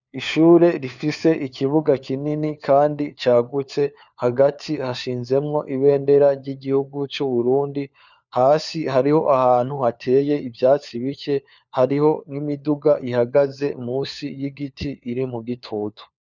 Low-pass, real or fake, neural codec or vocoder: 7.2 kHz; fake; codec, 16 kHz, 4 kbps, FunCodec, trained on LibriTTS, 50 frames a second